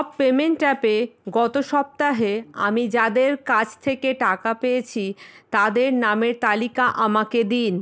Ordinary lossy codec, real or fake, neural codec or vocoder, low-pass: none; real; none; none